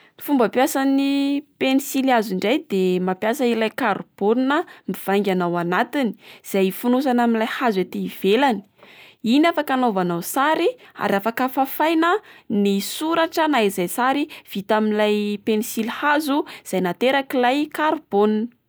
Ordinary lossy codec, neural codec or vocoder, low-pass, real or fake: none; none; none; real